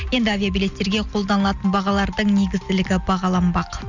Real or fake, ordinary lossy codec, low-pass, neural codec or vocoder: real; none; 7.2 kHz; none